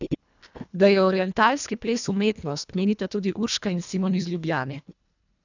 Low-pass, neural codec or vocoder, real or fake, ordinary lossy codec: 7.2 kHz; codec, 24 kHz, 1.5 kbps, HILCodec; fake; none